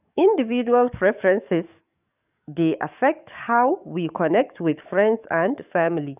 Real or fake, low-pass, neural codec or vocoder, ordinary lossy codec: fake; 3.6 kHz; codec, 44.1 kHz, 7.8 kbps, DAC; none